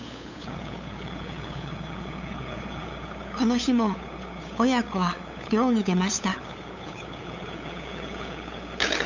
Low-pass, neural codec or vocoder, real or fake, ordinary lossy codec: 7.2 kHz; codec, 16 kHz, 8 kbps, FunCodec, trained on LibriTTS, 25 frames a second; fake; none